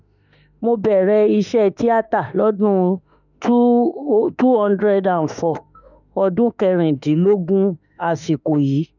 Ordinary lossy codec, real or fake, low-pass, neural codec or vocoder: none; fake; 7.2 kHz; autoencoder, 48 kHz, 32 numbers a frame, DAC-VAE, trained on Japanese speech